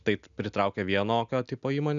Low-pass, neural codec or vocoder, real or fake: 7.2 kHz; none; real